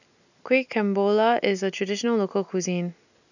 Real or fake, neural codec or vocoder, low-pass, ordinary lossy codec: real; none; 7.2 kHz; none